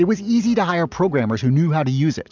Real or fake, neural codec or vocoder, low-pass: real; none; 7.2 kHz